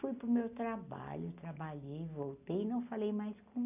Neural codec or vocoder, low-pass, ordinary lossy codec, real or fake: none; 3.6 kHz; none; real